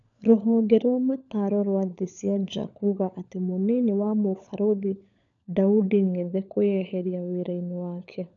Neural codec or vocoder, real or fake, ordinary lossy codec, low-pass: codec, 16 kHz, 16 kbps, FunCodec, trained on LibriTTS, 50 frames a second; fake; none; 7.2 kHz